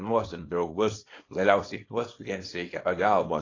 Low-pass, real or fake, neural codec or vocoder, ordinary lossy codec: 7.2 kHz; fake; codec, 24 kHz, 0.9 kbps, WavTokenizer, small release; AAC, 32 kbps